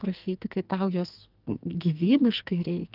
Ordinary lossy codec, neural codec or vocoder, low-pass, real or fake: Opus, 24 kbps; codec, 44.1 kHz, 2.6 kbps, SNAC; 5.4 kHz; fake